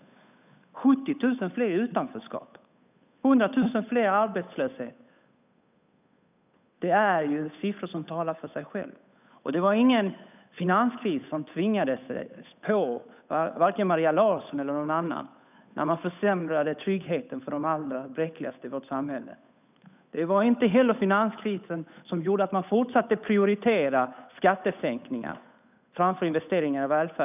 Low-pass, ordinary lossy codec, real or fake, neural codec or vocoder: 3.6 kHz; none; fake; codec, 16 kHz, 8 kbps, FunCodec, trained on Chinese and English, 25 frames a second